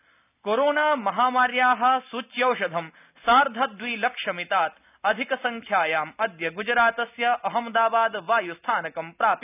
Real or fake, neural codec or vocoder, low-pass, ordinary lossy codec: real; none; 3.6 kHz; none